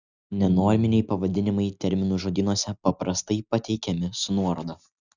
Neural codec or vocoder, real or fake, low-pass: none; real; 7.2 kHz